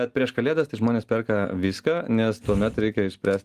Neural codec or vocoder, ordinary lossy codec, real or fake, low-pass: none; Opus, 24 kbps; real; 14.4 kHz